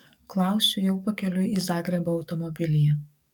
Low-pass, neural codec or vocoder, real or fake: 19.8 kHz; codec, 44.1 kHz, 7.8 kbps, DAC; fake